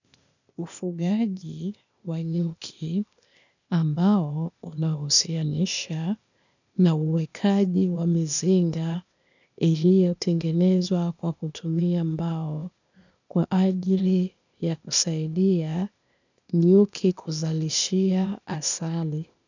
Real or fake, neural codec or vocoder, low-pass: fake; codec, 16 kHz, 0.8 kbps, ZipCodec; 7.2 kHz